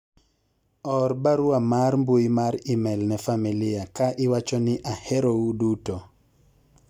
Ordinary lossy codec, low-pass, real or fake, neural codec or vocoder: none; 19.8 kHz; real; none